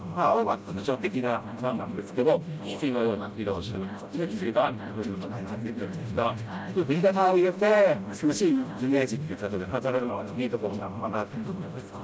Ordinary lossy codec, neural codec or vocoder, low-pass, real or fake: none; codec, 16 kHz, 0.5 kbps, FreqCodec, smaller model; none; fake